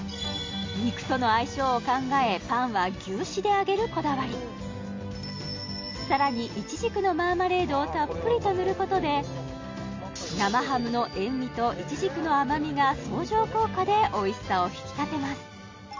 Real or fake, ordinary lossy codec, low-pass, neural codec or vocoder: real; MP3, 48 kbps; 7.2 kHz; none